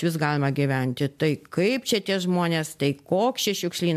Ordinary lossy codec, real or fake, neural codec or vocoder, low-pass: MP3, 96 kbps; real; none; 14.4 kHz